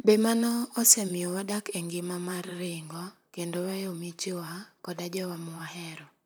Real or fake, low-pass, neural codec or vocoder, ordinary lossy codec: fake; none; vocoder, 44.1 kHz, 128 mel bands, Pupu-Vocoder; none